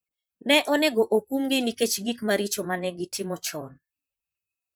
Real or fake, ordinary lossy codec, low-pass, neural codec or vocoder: fake; none; none; vocoder, 44.1 kHz, 128 mel bands, Pupu-Vocoder